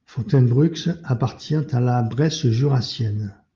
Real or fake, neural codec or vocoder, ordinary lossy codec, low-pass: real; none; Opus, 32 kbps; 7.2 kHz